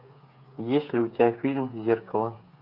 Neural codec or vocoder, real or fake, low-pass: codec, 16 kHz, 8 kbps, FreqCodec, smaller model; fake; 5.4 kHz